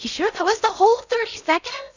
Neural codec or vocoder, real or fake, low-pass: codec, 16 kHz in and 24 kHz out, 0.6 kbps, FocalCodec, streaming, 4096 codes; fake; 7.2 kHz